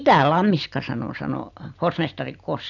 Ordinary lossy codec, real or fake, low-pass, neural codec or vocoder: none; real; 7.2 kHz; none